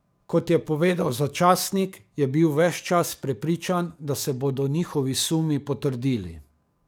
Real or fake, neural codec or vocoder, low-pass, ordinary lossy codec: fake; codec, 44.1 kHz, 7.8 kbps, DAC; none; none